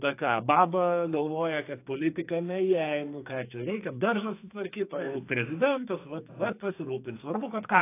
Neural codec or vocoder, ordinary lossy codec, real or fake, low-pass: codec, 44.1 kHz, 2.6 kbps, SNAC; AAC, 24 kbps; fake; 3.6 kHz